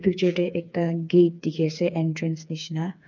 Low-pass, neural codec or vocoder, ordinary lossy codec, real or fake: 7.2 kHz; codec, 16 kHz, 4 kbps, FreqCodec, smaller model; none; fake